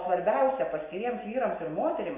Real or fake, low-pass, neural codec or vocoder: real; 3.6 kHz; none